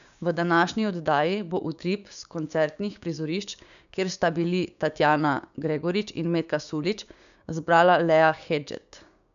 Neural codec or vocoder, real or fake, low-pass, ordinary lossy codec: codec, 16 kHz, 6 kbps, DAC; fake; 7.2 kHz; none